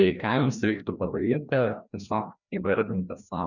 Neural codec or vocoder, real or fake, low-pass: codec, 16 kHz, 1 kbps, FreqCodec, larger model; fake; 7.2 kHz